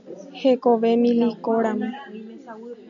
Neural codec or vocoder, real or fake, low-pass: none; real; 7.2 kHz